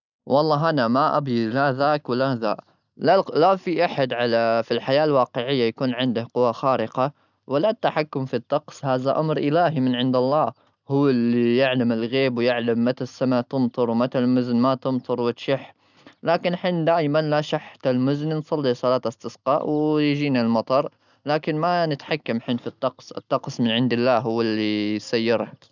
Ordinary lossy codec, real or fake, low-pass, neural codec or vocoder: none; real; 7.2 kHz; none